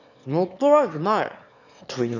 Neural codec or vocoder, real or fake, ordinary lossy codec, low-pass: autoencoder, 22.05 kHz, a latent of 192 numbers a frame, VITS, trained on one speaker; fake; none; 7.2 kHz